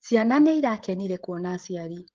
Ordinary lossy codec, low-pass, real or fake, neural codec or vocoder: Opus, 16 kbps; 7.2 kHz; fake; codec, 16 kHz, 16 kbps, FreqCodec, smaller model